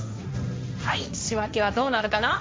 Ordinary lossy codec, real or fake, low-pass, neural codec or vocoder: none; fake; none; codec, 16 kHz, 1.1 kbps, Voila-Tokenizer